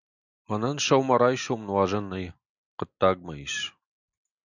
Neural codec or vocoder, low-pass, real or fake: none; 7.2 kHz; real